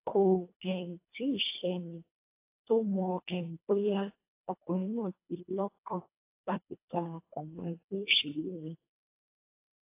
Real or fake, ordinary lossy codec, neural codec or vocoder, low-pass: fake; AAC, 24 kbps; codec, 24 kHz, 1.5 kbps, HILCodec; 3.6 kHz